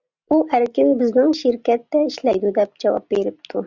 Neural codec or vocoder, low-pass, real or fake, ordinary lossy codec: none; 7.2 kHz; real; Opus, 64 kbps